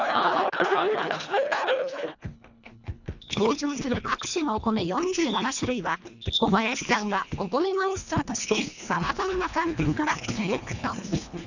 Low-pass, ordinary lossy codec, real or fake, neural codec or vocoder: 7.2 kHz; none; fake; codec, 24 kHz, 1.5 kbps, HILCodec